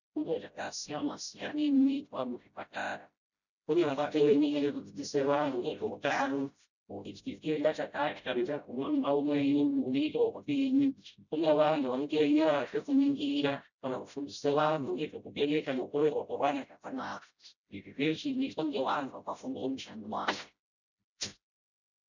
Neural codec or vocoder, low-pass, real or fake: codec, 16 kHz, 0.5 kbps, FreqCodec, smaller model; 7.2 kHz; fake